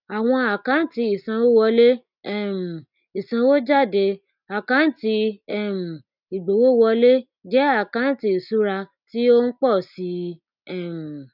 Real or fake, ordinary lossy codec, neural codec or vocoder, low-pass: real; none; none; 5.4 kHz